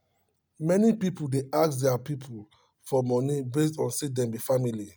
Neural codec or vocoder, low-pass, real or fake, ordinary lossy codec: none; none; real; none